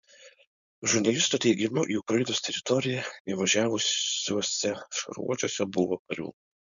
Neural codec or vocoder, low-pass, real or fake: codec, 16 kHz, 4.8 kbps, FACodec; 7.2 kHz; fake